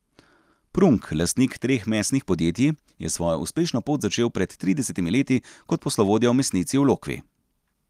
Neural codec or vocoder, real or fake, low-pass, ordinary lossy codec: none; real; 14.4 kHz; Opus, 32 kbps